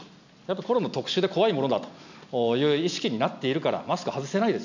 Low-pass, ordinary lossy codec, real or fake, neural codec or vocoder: 7.2 kHz; none; real; none